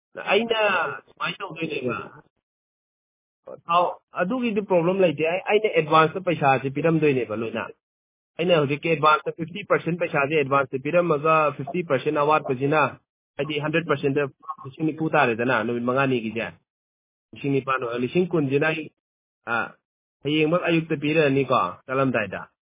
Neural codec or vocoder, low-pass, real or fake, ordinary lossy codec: none; 3.6 kHz; real; MP3, 16 kbps